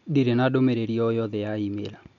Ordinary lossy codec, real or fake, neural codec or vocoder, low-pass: none; real; none; 7.2 kHz